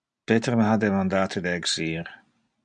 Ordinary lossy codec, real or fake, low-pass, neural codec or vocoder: MP3, 96 kbps; real; 9.9 kHz; none